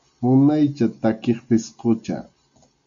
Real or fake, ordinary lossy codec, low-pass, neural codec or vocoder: real; MP3, 64 kbps; 7.2 kHz; none